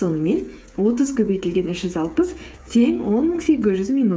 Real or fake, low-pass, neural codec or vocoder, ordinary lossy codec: fake; none; codec, 16 kHz, 8 kbps, FreqCodec, smaller model; none